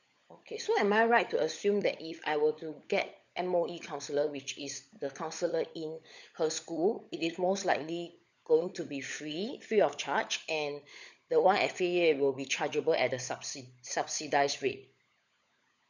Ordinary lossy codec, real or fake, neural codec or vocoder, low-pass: none; fake; codec, 16 kHz, 16 kbps, FunCodec, trained on LibriTTS, 50 frames a second; 7.2 kHz